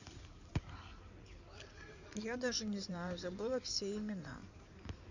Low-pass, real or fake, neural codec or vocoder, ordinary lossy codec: 7.2 kHz; fake; codec, 16 kHz in and 24 kHz out, 2.2 kbps, FireRedTTS-2 codec; none